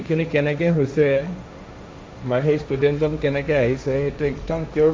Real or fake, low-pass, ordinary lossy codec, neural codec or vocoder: fake; none; none; codec, 16 kHz, 1.1 kbps, Voila-Tokenizer